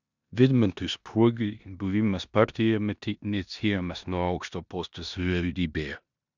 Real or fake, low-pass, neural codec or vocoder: fake; 7.2 kHz; codec, 16 kHz in and 24 kHz out, 0.9 kbps, LongCat-Audio-Codec, four codebook decoder